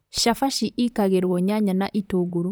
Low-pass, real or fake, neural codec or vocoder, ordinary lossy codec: none; fake; vocoder, 44.1 kHz, 128 mel bands, Pupu-Vocoder; none